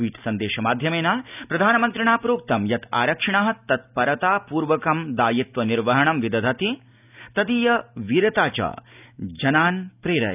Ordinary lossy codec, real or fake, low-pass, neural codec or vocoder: none; real; 3.6 kHz; none